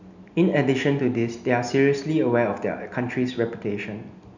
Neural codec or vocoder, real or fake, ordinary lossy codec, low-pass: none; real; none; 7.2 kHz